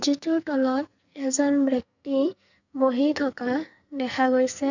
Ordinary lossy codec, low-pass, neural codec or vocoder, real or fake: none; 7.2 kHz; codec, 44.1 kHz, 2.6 kbps, SNAC; fake